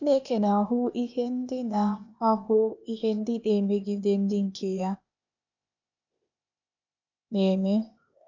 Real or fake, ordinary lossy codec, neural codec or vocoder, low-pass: fake; none; codec, 16 kHz, 0.8 kbps, ZipCodec; 7.2 kHz